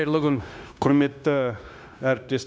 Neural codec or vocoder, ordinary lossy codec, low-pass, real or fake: codec, 16 kHz, 0.9 kbps, LongCat-Audio-Codec; none; none; fake